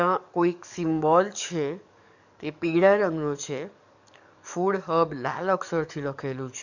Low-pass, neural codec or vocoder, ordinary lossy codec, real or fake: 7.2 kHz; codec, 44.1 kHz, 7.8 kbps, DAC; none; fake